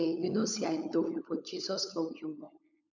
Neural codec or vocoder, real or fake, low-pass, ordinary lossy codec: codec, 16 kHz, 16 kbps, FunCodec, trained on LibriTTS, 50 frames a second; fake; 7.2 kHz; none